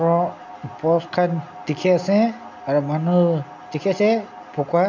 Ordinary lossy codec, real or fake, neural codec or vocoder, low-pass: none; real; none; 7.2 kHz